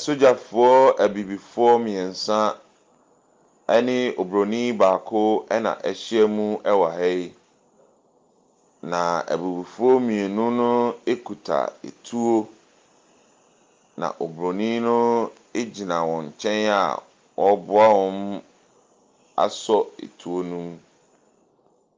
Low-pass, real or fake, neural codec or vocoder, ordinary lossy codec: 7.2 kHz; real; none; Opus, 24 kbps